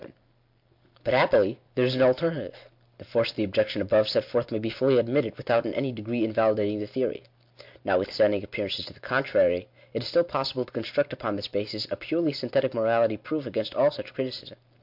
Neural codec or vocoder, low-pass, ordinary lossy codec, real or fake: none; 5.4 kHz; MP3, 48 kbps; real